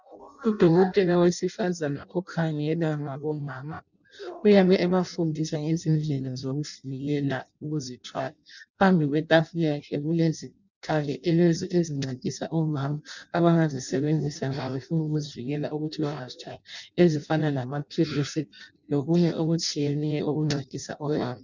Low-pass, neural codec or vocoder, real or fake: 7.2 kHz; codec, 16 kHz in and 24 kHz out, 0.6 kbps, FireRedTTS-2 codec; fake